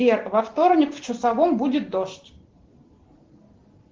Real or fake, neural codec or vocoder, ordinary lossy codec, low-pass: real; none; Opus, 16 kbps; 7.2 kHz